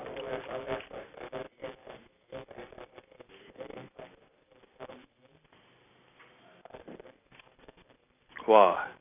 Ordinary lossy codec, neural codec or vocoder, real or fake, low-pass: none; none; real; 3.6 kHz